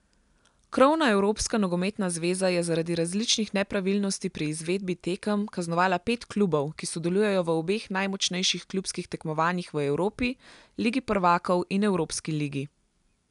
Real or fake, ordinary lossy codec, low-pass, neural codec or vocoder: real; none; 10.8 kHz; none